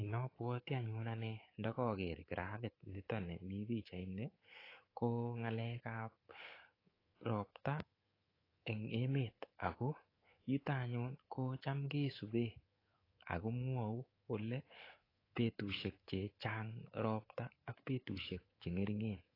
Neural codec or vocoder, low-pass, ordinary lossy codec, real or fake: codec, 24 kHz, 3.1 kbps, DualCodec; 5.4 kHz; AAC, 24 kbps; fake